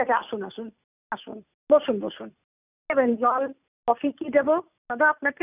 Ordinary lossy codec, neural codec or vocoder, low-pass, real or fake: none; none; 3.6 kHz; real